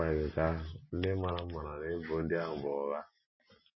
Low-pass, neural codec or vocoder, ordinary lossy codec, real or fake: 7.2 kHz; none; MP3, 24 kbps; real